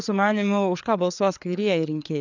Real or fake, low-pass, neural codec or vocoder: fake; 7.2 kHz; codec, 16 kHz, 4 kbps, FreqCodec, larger model